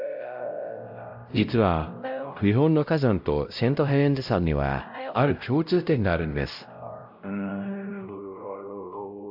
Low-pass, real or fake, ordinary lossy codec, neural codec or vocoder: 5.4 kHz; fake; AAC, 48 kbps; codec, 16 kHz, 0.5 kbps, X-Codec, WavLM features, trained on Multilingual LibriSpeech